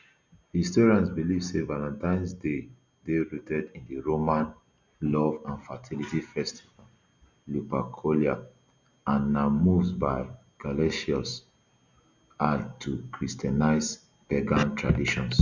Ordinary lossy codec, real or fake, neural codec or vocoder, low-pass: none; real; none; 7.2 kHz